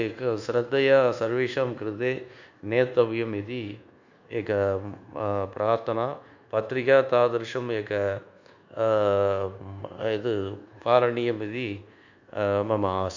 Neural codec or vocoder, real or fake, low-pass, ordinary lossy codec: codec, 24 kHz, 1.2 kbps, DualCodec; fake; 7.2 kHz; Opus, 64 kbps